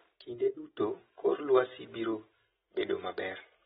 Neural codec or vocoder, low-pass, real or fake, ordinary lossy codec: none; 19.8 kHz; real; AAC, 16 kbps